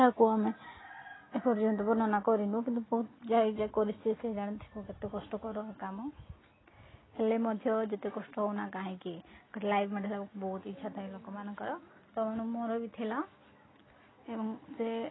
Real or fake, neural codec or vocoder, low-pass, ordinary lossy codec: real; none; 7.2 kHz; AAC, 16 kbps